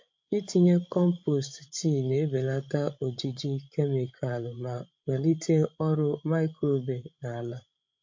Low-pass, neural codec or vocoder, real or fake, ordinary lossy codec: 7.2 kHz; codec, 16 kHz, 16 kbps, FreqCodec, larger model; fake; MP3, 48 kbps